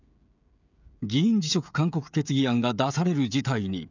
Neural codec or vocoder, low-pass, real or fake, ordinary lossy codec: codec, 16 kHz, 16 kbps, FreqCodec, smaller model; 7.2 kHz; fake; none